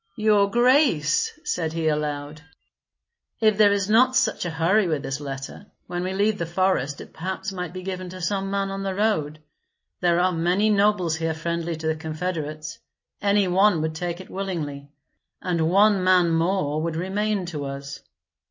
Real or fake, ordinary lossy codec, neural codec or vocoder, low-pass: real; MP3, 32 kbps; none; 7.2 kHz